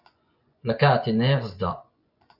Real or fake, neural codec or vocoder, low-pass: fake; vocoder, 24 kHz, 100 mel bands, Vocos; 5.4 kHz